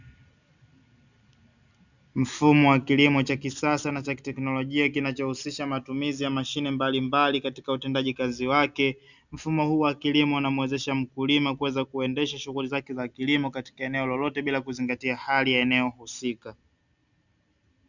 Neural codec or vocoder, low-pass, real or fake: none; 7.2 kHz; real